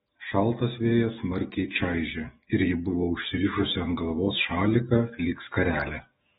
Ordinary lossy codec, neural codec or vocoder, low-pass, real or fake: AAC, 16 kbps; autoencoder, 48 kHz, 128 numbers a frame, DAC-VAE, trained on Japanese speech; 19.8 kHz; fake